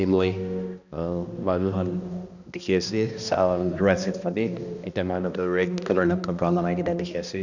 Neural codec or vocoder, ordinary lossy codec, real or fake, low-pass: codec, 16 kHz, 1 kbps, X-Codec, HuBERT features, trained on balanced general audio; none; fake; 7.2 kHz